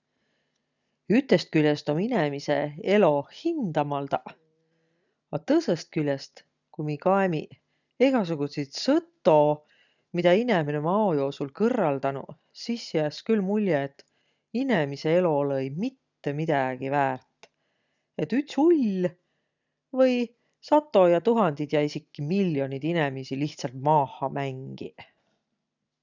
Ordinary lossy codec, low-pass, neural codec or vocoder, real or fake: none; 7.2 kHz; none; real